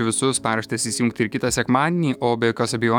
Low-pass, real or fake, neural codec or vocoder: 19.8 kHz; fake; autoencoder, 48 kHz, 128 numbers a frame, DAC-VAE, trained on Japanese speech